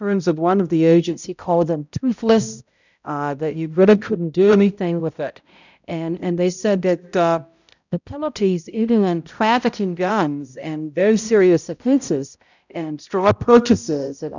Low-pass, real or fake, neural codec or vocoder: 7.2 kHz; fake; codec, 16 kHz, 0.5 kbps, X-Codec, HuBERT features, trained on balanced general audio